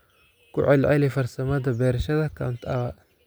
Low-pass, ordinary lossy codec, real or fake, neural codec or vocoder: none; none; real; none